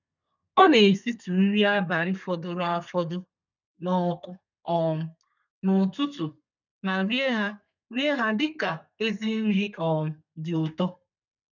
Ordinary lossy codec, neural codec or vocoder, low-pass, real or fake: none; codec, 32 kHz, 1.9 kbps, SNAC; 7.2 kHz; fake